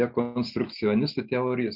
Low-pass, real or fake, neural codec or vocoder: 5.4 kHz; real; none